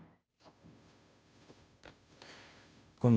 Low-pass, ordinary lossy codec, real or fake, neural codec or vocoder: none; none; fake; codec, 16 kHz, 0.5 kbps, FunCodec, trained on Chinese and English, 25 frames a second